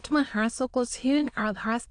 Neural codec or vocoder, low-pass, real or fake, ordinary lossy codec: autoencoder, 22.05 kHz, a latent of 192 numbers a frame, VITS, trained on many speakers; 9.9 kHz; fake; AAC, 64 kbps